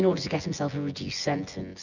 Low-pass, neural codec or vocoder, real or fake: 7.2 kHz; vocoder, 24 kHz, 100 mel bands, Vocos; fake